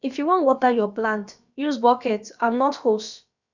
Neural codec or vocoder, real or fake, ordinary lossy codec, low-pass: codec, 16 kHz, about 1 kbps, DyCAST, with the encoder's durations; fake; none; 7.2 kHz